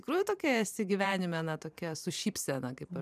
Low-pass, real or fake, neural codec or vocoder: 14.4 kHz; fake; vocoder, 44.1 kHz, 128 mel bands every 512 samples, BigVGAN v2